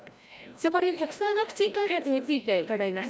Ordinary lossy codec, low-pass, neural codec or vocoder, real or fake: none; none; codec, 16 kHz, 0.5 kbps, FreqCodec, larger model; fake